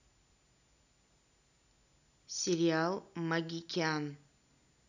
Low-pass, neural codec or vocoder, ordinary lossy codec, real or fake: 7.2 kHz; none; none; real